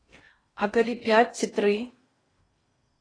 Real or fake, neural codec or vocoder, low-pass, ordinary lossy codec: fake; codec, 16 kHz in and 24 kHz out, 0.8 kbps, FocalCodec, streaming, 65536 codes; 9.9 kHz; AAC, 32 kbps